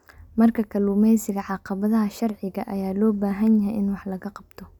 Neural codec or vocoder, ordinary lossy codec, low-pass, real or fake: none; none; 19.8 kHz; real